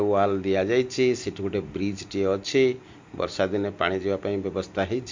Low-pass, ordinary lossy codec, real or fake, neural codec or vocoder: 7.2 kHz; MP3, 48 kbps; real; none